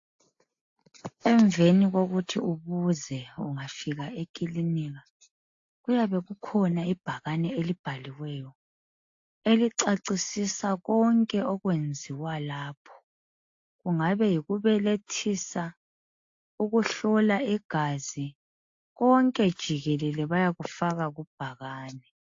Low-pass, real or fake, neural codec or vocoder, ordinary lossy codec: 7.2 kHz; real; none; AAC, 48 kbps